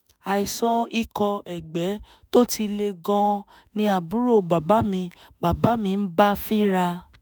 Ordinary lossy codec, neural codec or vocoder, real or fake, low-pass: none; autoencoder, 48 kHz, 32 numbers a frame, DAC-VAE, trained on Japanese speech; fake; none